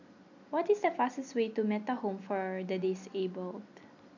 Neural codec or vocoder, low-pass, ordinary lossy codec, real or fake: none; 7.2 kHz; none; real